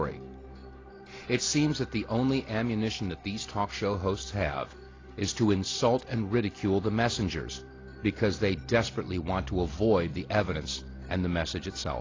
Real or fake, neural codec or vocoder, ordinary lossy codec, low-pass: real; none; AAC, 32 kbps; 7.2 kHz